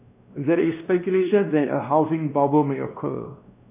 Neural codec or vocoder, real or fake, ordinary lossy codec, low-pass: codec, 16 kHz, 1 kbps, X-Codec, WavLM features, trained on Multilingual LibriSpeech; fake; none; 3.6 kHz